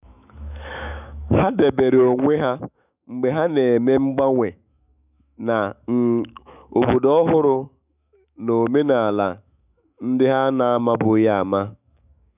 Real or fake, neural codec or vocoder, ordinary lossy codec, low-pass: fake; autoencoder, 48 kHz, 128 numbers a frame, DAC-VAE, trained on Japanese speech; none; 3.6 kHz